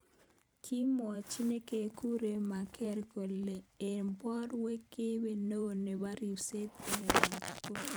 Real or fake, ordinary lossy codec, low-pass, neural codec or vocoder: fake; none; none; vocoder, 44.1 kHz, 128 mel bands every 512 samples, BigVGAN v2